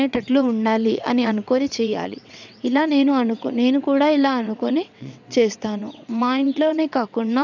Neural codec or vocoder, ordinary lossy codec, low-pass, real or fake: vocoder, 22.05 kHz, 80 mel bands, WaveNeXt; none; 7.2 kHz; fake